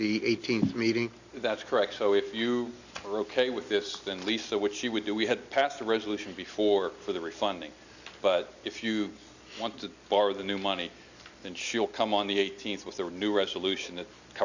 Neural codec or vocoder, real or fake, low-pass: none; real; 7.2 kHz